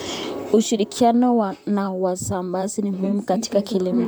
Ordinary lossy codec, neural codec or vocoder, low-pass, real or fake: none; vocoder, 44.1 kHz, 128 mel bands, Pupu-Vocoder; none; fake